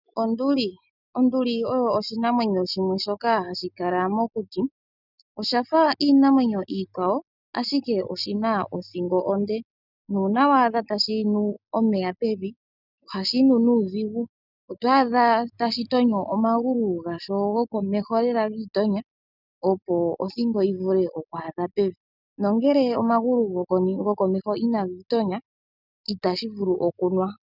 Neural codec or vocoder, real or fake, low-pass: none; real; 5.4 kHz